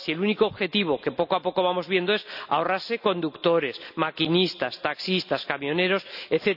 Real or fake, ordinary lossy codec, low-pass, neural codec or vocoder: real; none; 5.4 kHz; none